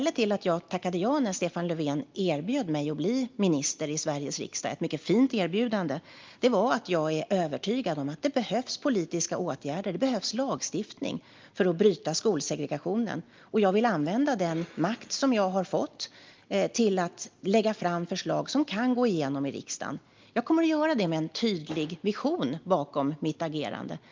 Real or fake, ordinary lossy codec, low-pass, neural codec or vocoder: real; Opus, 32 kbps; 7.2 kHz; none